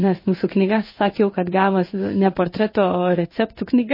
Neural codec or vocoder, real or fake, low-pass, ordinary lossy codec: codec, 16 kHz in and 24 kHz out, 1 kbps, XY-Tokenizer; fake; 5.4 kHz; MP3, 24 kbps